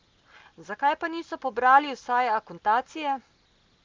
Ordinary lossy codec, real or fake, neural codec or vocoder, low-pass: Opus, 32 kbps; real; none; 7.2 kHz